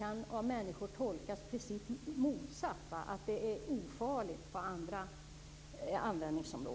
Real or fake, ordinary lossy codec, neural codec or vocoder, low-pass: real; none; none; none